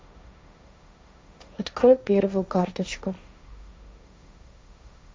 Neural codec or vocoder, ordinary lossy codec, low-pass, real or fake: codec, 16 kHz, 1.1 kbps, Voila-Tokenizer; none; none; fake